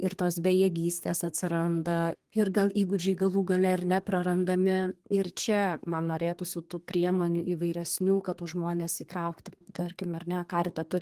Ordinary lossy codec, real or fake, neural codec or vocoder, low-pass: Opus, 24 kbps; fake; codec, 32 kHz, 1.9 kbps, SNAC; 14.4 kHz